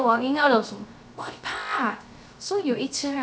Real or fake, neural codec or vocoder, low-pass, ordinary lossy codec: fake; codec, 16 kHz, 0.3 kbps, FocalCodec; none; none